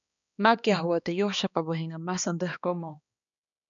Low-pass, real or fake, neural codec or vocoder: 7.2 kHz; fake; codec, 16 kHz, 2 kbps, X-Codec, HuBERT features, trained on balanced general audio